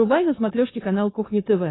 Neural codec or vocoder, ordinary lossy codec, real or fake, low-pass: codec, 16 kHz, 4.8 kbps, FACodec; AAC, 16 kbps; fake; 7.2 kHz